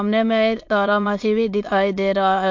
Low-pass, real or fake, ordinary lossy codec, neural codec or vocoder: 7.2 kHz; fake; MP3, 48 kbps; autoencoder, 22.05 kHz, a latent of 192 numbers a frame, VITS, trained on many speakers